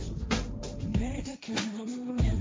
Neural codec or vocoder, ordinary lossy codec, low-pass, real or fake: codec, 16 kHz, 1.1 kbps, Voila-Tokenizer; none; none; fake